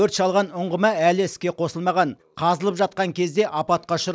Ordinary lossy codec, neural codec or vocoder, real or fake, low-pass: none; none; real; none